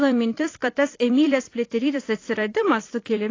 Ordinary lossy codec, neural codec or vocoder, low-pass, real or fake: AAC, 32 kbps; vocoder, 44.1 kHz, 128 mel bands every 256 samples, BigVGAN v2; 7.2 kHz; fake